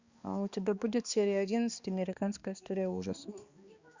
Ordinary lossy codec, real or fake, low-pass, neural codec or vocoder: Opus, 64 kbps; fake; 7.2 kHz; codec, 16 kHz, 2 kbps, X-Codec, HuBERT features, trained on balanced general audio